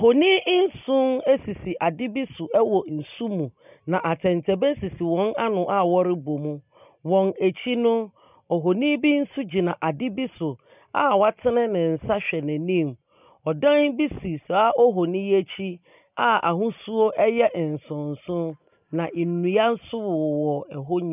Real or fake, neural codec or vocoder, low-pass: real; none; 3.6 kHz